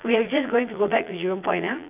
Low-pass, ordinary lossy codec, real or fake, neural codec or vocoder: 3.6 kHz; none; fake; vocoder, 22.05 kHz, 80 mel bands, Vocos